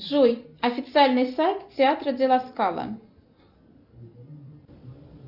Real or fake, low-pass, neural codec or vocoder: real; 5.4 kHz; none